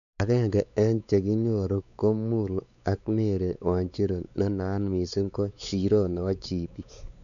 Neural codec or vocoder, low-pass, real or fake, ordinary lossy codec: codec, 16 kHz, 4 kbps, X-Codec, WavLM features, trained on Multilingual LibriSpeech; 7.2 kHz; fake; none